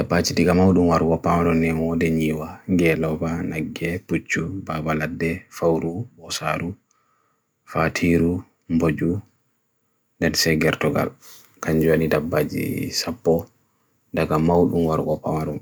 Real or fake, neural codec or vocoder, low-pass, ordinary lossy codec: real; none; none; none